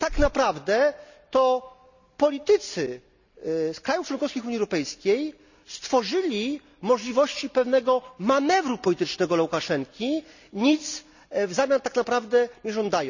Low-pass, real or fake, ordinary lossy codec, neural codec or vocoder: 7.2 kHz; real; none; none